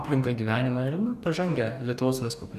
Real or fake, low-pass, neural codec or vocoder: fake; 14.4 kHz; codec, 44.1 kHz, 2.6 kbps, DAC